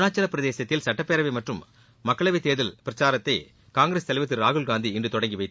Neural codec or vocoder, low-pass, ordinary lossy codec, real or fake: none; none; none; real